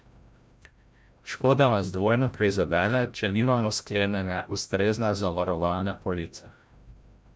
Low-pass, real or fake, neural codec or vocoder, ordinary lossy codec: none; fake; codec, 16 kHz, 0.5 kbps, FreqCodec, larger model; none